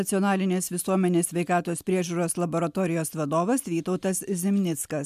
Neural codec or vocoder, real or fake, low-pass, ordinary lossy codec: none; real; 14.4 kHz; MP3, 96 kbps